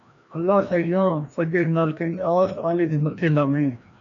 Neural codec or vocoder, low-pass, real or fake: codec, 16 kHz, 1 kbps, FreqCodec, larger model; 7.2 kHz; fake